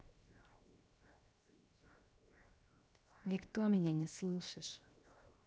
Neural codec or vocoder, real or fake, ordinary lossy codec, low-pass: codec, 16 kHz, 0.7 kbps, FocalCodec; fake; none; none